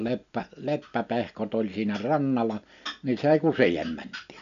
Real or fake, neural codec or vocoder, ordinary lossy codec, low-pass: real; none; none; 7.2 kHz